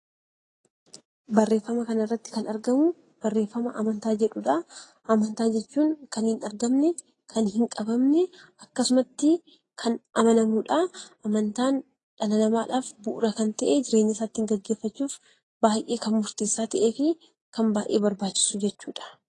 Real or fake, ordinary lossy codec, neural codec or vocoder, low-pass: real; AAC, 32 kbps; none; 10.8 kHz